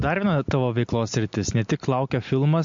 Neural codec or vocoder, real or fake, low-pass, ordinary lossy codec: none; real; 7.2 kHz; MP3, 48 kbps